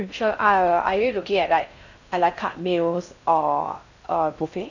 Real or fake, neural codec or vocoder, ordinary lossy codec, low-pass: fake; codec, 16 kHz in and 24 kHz out, 0.6 kbps, FocalCodec, streaming, 2048 codes; none; 7.2 kHz